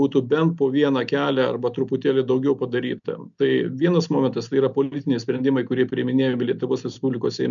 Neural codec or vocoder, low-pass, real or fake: none; 7.2 kHz; real